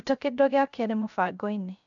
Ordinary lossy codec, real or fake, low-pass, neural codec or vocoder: MP3, 96 kbps; fake; 7.2 kHz; codec, 16 kHz, 0.3 kbps, FocalCodec